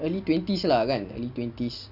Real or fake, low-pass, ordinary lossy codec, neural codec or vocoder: real; 5.4 kHz; Opus, 64 kbps; none